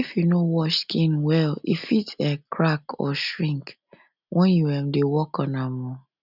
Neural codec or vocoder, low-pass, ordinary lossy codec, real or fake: none; 5.4 kHz; none; real